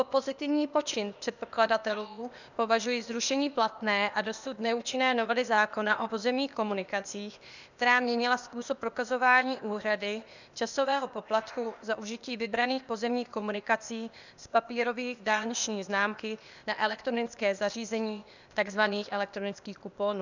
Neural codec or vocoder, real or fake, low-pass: codec, 16 kHz, 0.8 kbps, ZipCodec; fake; 7.2 kHz